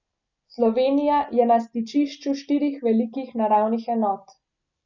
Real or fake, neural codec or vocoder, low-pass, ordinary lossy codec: real; none; 7.2 kHz; none